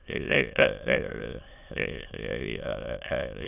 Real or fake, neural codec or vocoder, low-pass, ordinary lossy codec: fake; autoencoder, 22.05 kHz, a latent of 192 numbers a frame, VITS, trained on many speakers; 3.6 kHz; none